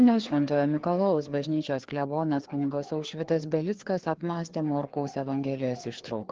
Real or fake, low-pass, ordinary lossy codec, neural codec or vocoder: fake; 7.2 kHz; Opus, 16 kbps; codec, 16 kHz, 2 kbps, FreqCodec, larger model